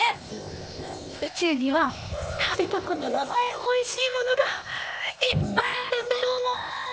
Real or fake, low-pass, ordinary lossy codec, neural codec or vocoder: fake; none; none; codec, 16 kHz, 0.8 kbps, ZipCodec